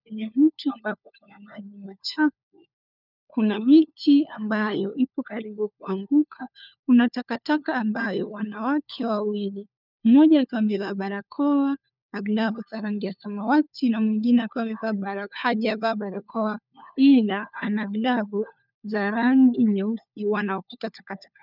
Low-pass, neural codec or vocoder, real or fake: 5.4 kHz; codec, 16 kHz, 4 kbps, FunCodec, trained on LibriTTS, 50 frames a second; fake